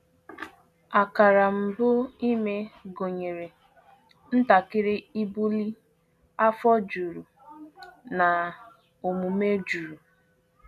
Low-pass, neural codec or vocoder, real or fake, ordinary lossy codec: 14.4 kHz; none; real; none